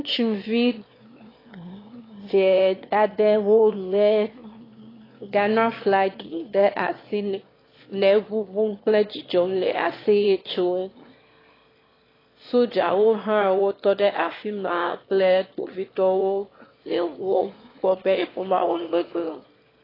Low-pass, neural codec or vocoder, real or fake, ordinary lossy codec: 5.4 kHz; autoencoder, 22.05 kHz, a latent of 192 numbers a frame, VITS, trained on one speaker; fake; AAC, 24 kbps